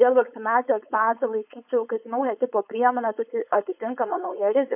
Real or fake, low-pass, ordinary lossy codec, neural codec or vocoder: fake; 3.6 kHz; AAC, 32 kbps; codec, 16 kHz, 4.8 kbps, FACodec